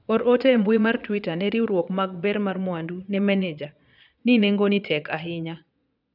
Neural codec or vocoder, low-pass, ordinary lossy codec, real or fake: vocoder, 22.05 kHz, 80 mel bands, Vocos; 5.4 kHz; none; fake